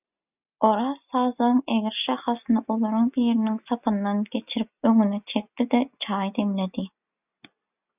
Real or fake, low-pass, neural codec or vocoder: real; 3.6 kHz; none